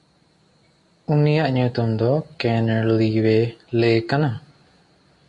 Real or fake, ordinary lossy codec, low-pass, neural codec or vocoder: real; MP3, 48 kbps; 10.8 kHz; none